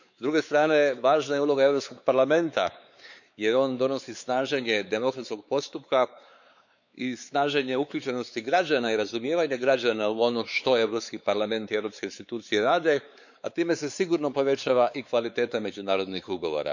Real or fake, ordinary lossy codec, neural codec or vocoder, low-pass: fake; none; codec, 16 kHz, 4 kbps, X-Codec, WavLM features, trained on Multilingual LibriSpeech; 7.2 kHz